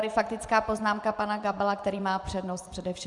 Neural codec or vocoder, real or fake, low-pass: vocoder, 24 kHz, 100 mel bands, Vocos; fake; 10.8 kHz